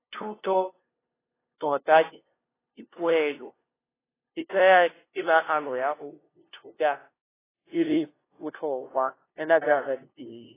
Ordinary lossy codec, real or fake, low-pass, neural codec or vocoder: AAC, 16 kbps; fake; 3.6 kHz; codec, 16 kHz, 0.5 kbps, FunCodec, trained on LibriTTS, 25 frames a second